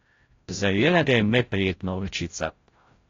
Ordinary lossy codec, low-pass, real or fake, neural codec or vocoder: AAC, 32 kbps; 7.2 kHz; fake; codec, 16 kHz, 0.5 kbps, FreqCodec, larger model